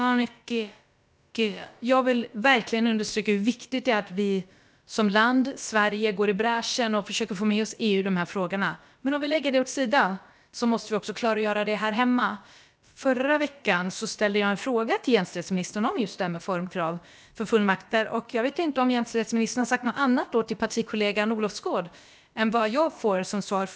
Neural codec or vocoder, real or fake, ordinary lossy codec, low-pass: codec, 16 kHz, about 1 kbps, DyCAST, with the encoder's durations; fake; none; none